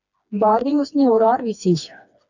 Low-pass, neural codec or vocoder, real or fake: 7.2 kHz; codec, 16 kHz, 2 kbps, FreqCodec, smaller model; fake